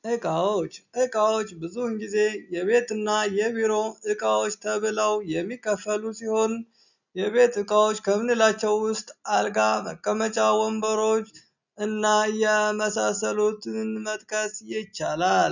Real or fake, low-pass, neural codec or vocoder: real; 7.2 kHz; none